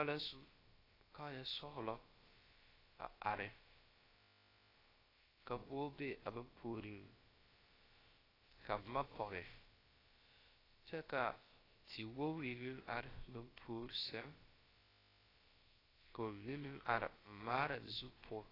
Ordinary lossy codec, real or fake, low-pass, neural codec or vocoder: AAC, 24 kbps; fake; 5.4 kHz; codec, 16 kHz, about 1 kbps, DyCAST, with the encoder's durations